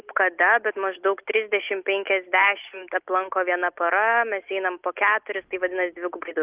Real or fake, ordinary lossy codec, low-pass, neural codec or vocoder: real; Opus, 32 kbps; 3.6 kHz; none